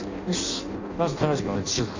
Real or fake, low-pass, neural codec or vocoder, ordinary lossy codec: fake; 7.2 kHz; codec, 16 kHz in and 24 kHz out, 0.6 kbps, FireRedTTS-2 codec; Opus, 64 kbps